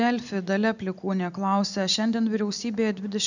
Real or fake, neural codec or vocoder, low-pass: real; none; 7.2 kHz